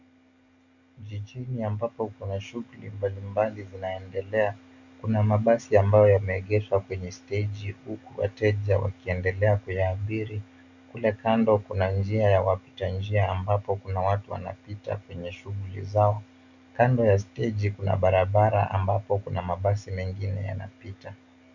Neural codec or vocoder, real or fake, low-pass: none; real; 7.2 kHz